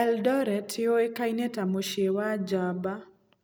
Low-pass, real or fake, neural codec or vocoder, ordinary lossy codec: none; real; none; none